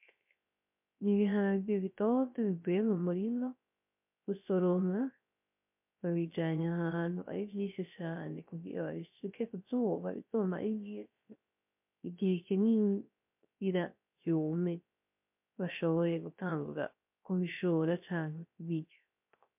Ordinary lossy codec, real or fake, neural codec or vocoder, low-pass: MP3, 32 kbps; fake; codec, 16 kHz, 0.3 kbps, FocalCodec; 3.6 kHz